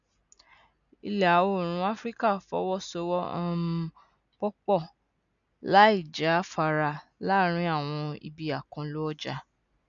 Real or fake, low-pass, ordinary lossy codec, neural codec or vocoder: real; 7.2 kHz; none; none